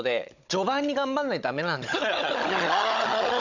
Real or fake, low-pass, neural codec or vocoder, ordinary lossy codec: fake; 7.2 kHz; codec, 16 kHz, 16 kbps, FunCodec, trained on Chinese and English, 50 frames a second; none